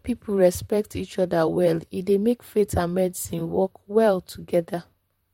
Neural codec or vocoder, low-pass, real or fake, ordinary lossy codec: vocoder, 44.1 kHz, 128 mel bands, Pupu-Vocoder; 19.8 kHz; fake; MP3, 64 kbps